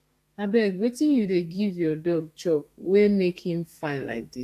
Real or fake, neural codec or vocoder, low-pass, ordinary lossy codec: fake; codec, 32 kHz, 1.9 kbps, SNAC; 14.4 kHz; AAC, 64 kbps